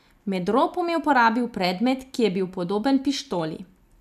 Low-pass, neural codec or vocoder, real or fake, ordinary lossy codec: 14.4 kHz; none; real; none